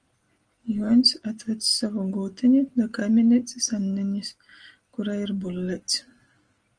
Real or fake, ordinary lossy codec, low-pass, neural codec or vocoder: real; Opus, 24 kbps; 9.9 kHz; none